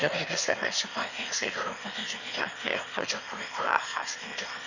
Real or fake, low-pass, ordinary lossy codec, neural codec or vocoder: fake; 7.2 kHz; none; autoencoder, 22.05 kHz, a latent of 192 numbers a frame, VITS, trained on one speaker